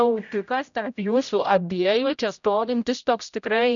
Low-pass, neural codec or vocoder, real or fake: 7.2 kHz; codec, 16 kHz, 0.5 kbps, X-Codec, HuBERT features, trained on general audio; fake